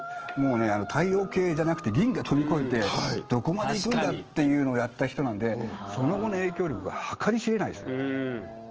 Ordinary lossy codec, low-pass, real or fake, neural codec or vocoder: Opus, 16 kbps; 7.2 kHz; fake; autoencoder, 48 kHz, 128 numbers a frame, DAC-VAE, trained on Japanese speech